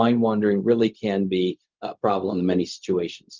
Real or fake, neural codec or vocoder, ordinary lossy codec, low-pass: fake; codec, 16 kHz, 0.4 kbps, LongCat-Audio-Codec; Opus, 24 kbps; 7.2 kHz